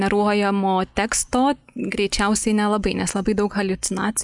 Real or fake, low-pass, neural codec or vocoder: real; 10.8 kHz; none